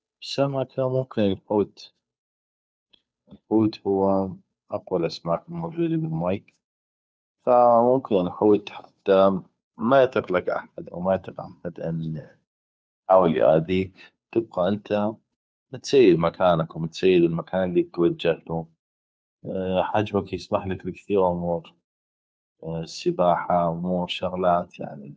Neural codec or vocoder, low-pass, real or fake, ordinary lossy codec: codec, 16 kHz, 2 kbps, FunCodec, trained on Chinese and English, 25 frames a second; none; fake; none